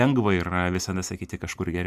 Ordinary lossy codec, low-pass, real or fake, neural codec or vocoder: MP3, 96 kbps; 14.4 kHz; real; none